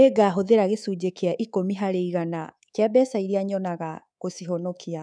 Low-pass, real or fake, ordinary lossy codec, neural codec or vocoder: 9.9 kHz; fake; none; codec, 24 kHz, 3.1 kbps, DualCodec